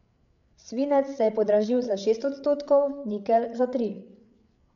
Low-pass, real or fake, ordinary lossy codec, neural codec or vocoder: 7.2 kHz; fake; none; codec, 16 kHz, 8 kbps, FreqCodec, larger model